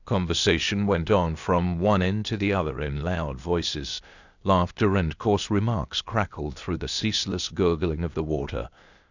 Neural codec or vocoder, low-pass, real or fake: codec, 16 kHz, 0.8 kbps, ZipCodec; 7.2 kHz; fake